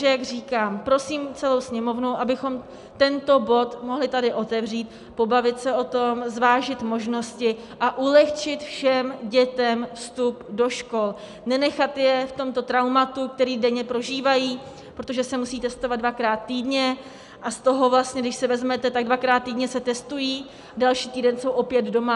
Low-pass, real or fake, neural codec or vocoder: 10.8 kHz; real; none